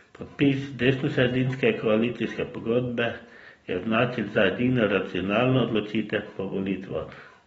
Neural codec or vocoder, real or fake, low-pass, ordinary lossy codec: none; real; 19.8 kHz; AAC, 24 kbps